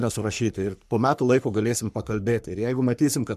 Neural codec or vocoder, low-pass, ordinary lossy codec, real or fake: codec, 44.1 kHz, 3.4 kbps, Pupu-Codec; 14.4 kHz; MP3, 96 kbps; fake